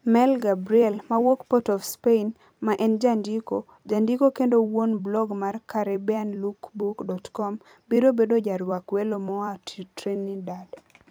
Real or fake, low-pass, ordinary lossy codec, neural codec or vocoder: fake; none; none; vocoder, 44.1 kHz, 128 mel bands every 256 samples, BigVGAN v2